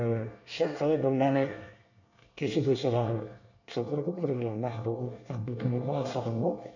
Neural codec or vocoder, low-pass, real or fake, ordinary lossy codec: codec, 24 kHz, 1 kbps, SNAC; 7.2 kHz; fake; none